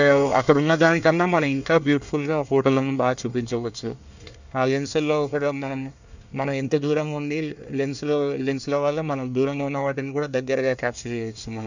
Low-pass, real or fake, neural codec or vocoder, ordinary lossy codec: 7.2 kHz; fake; codec, 24 kHz, 1 kbps, SNAC; none